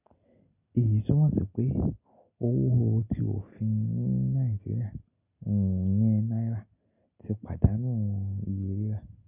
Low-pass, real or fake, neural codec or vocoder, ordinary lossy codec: 3.6 kHz; real; none; none